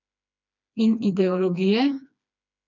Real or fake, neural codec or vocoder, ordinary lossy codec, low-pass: fake; codec, 16 kHz, 2 kbps, FreqCodec, smaller model; none; 7.2 kHz